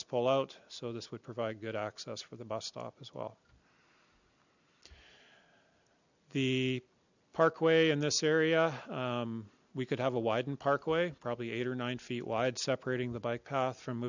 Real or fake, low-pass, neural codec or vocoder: real; 7.2 kHz; none